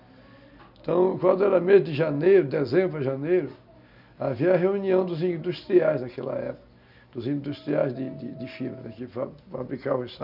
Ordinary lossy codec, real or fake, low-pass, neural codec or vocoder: none; real; 5.4 kHz; none